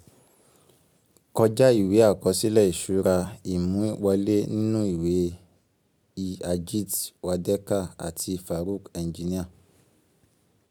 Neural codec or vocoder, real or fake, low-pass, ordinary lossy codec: none; real; none; none